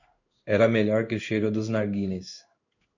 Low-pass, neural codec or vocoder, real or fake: 7.2 kHz; codec, 16 kHz in and 24 kHz out, 1 kbps, XY-Tokenizer; fake